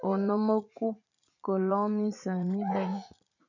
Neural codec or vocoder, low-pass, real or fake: vocoder, 44.1 kHz, 80 mel bands, Vocos; 7.2 kHz; fake